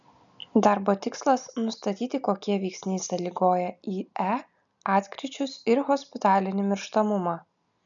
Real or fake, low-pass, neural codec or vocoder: real; 7.2 kHz; none